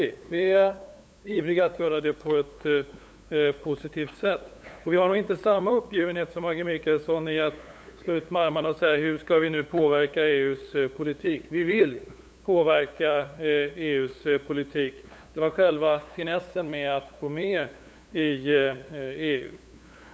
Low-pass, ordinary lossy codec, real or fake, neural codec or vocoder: none; none; fake; codec, 16 kHz, 8 kbps, FunCodec, trained on LibriTTS, 25 frames a second